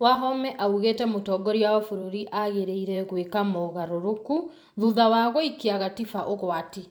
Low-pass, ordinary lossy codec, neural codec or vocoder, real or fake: none; none; vocoder, 44.1 kHz, 128 mel bands every 512 samples, BigVGAN v2; fake